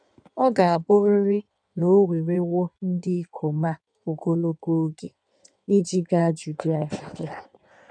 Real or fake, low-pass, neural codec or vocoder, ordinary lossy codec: fake; 9.9 kHz; codec, 16 kHz in and 24 kHz out, 1.1 kbps, FireRedTTS-2 codec; none